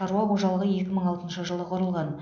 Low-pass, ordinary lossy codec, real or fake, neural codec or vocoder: none; none; real; none